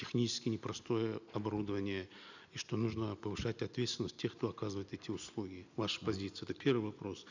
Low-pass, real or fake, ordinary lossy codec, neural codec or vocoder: 7.2 kHz; real; none; none